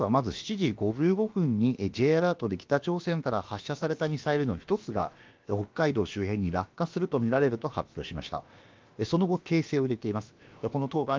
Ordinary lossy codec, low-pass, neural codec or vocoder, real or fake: Opus, 32 kbps; 7.2 kHz; codec, 16 kHz, about 1 kbps, DyCAST, with the encoder's durations; fake